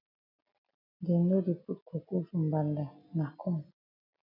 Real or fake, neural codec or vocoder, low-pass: real; none; 5.4 kHz